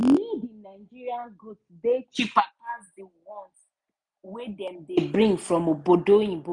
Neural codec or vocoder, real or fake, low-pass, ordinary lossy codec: none; real; 10.8 kHz; none